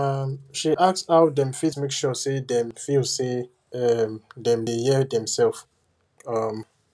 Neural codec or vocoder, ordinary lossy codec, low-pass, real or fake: none; none; none; real